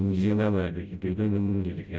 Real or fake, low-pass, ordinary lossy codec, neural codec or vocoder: fake; none; none; codec, 16 kHz, 0.5 kbps, FreqCodec, smaller model